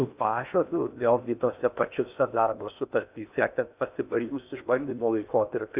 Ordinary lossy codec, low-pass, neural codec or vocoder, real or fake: Opus, 64 kbps; 3.6 kHz; codec, 16 kHz in and 24 kHz out, 0.8 kbps, FocalCodec, streaming, 65536 codes; fake